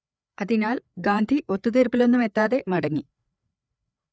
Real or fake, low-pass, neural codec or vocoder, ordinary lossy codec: fake; none; codec, 16 kHz, 4 kbps, FreqCodec, larger model; none